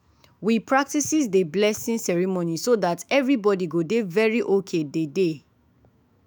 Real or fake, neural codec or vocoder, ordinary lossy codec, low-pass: fake; autoencoder, 48 kHz, 128 numbers a frame, DAC-VAE, trained on Japanese speech; none; none